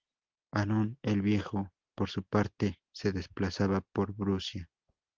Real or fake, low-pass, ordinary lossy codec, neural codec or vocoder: real; 7.2 kHz; Opus, 16 kbps; none